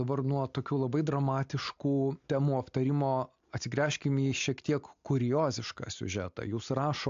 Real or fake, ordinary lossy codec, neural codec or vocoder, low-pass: real; AAC, 64 kbps; none; 7.2 kHz